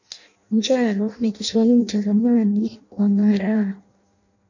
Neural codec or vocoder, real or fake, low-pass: codec, 16 kHz in and 24 kHz out, 0.6 kbps, FireRedTTS-2 codec; fake; 7.2 kHz